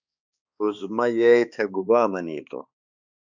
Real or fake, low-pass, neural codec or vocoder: fake; 7.2 kHz; codec, 16 kHz, 2 kbps, X-Codec, HuBERT features, trained on balanced general audio